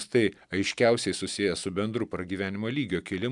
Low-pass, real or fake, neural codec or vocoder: 10.8 kHz; real; none